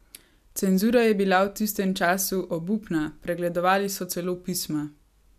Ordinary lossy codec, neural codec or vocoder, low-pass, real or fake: none; none; 14.4 kHz; real